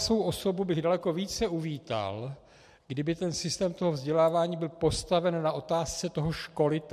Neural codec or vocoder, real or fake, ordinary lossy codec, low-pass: none; real; MP3, 64 kbps; 14.4 kHz